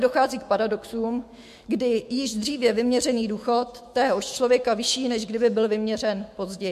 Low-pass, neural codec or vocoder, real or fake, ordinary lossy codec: 14.4 kHz; autoencoder, 48 kHz, 128 numbers a frame, DAC-VAE, trained on Japanese speech; fake; MP3, 64 kbps